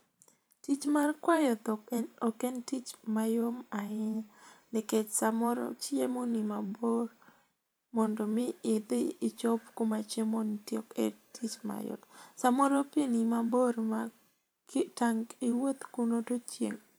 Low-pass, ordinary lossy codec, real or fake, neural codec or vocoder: none; none; fake; vocoder, 44.1 kHz, 128 mel bands every 512 samples, BigVGAN v2